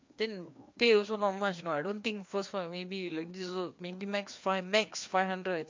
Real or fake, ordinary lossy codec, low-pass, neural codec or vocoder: fake; MP3, 48 kbps; 7.2 kHz; codec, 16 kHz, 2 kbps, FreqCodec, larger model